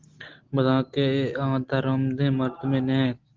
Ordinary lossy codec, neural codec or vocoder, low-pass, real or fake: Opus, 16 kbps; none; 7.2 kHz; real